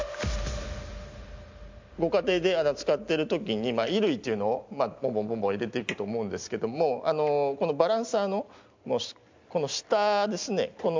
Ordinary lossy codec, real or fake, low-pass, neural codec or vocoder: MP3, 64 kbps; real; 7.2 kHz; none